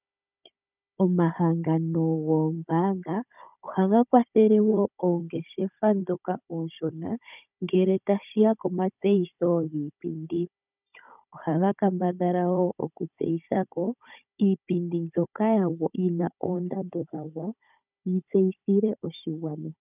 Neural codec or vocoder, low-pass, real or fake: codec, 16 kHz, 16 kbps, FunCodec, trained on Chinese and English, 50 frames a second; 3.6 kHz; fake